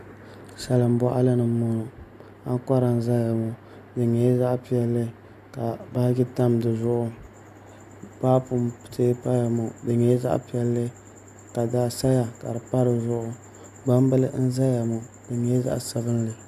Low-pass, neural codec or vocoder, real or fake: 14.4 kHz; none; real